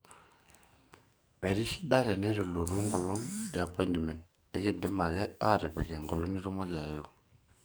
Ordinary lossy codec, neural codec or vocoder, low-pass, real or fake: none; codec, 44.1 kHz, 2.6 kbps, SNAC; none; fake